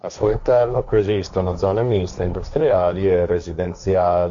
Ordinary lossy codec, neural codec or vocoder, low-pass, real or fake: AAC, 64 kbps; codec, 16 kHz, 1.1 kbps, Voila-Tokenizer; 7.2 kHz; fake